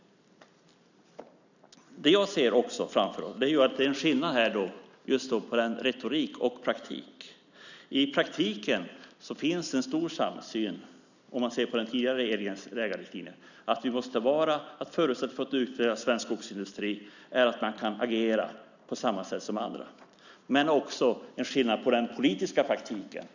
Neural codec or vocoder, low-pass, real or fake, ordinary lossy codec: none; 7.2 kHz; real; none